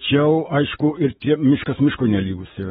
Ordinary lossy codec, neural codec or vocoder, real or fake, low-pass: AAC, 16 kbps; codec, 44.1 kHz, 7.8 kbps, DAC; fake; 19.8 kHz